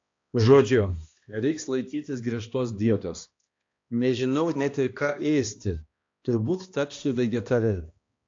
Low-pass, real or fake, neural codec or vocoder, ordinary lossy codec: 7.2 kHz; fake; codec, 16 kHz, 1 kbps, X-Codec, HuBERT features, trained on balanced general audio; AAC, 48 kbps